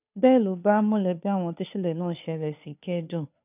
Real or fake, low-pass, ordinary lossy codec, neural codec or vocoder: fake; 3.6 kHz; none; codec, 16 kHz, 2 kbps, FunCodec, trained on Chinese and English, 25 frames a second